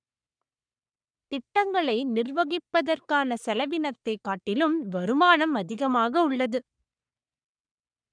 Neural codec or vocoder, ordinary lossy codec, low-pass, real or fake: codec, 44.1 kHz, 3.4 kbps, Pupu-Codec; none; 9.9 kHz; fake